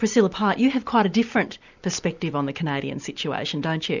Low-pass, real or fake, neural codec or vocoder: 7.2 kHz; real; none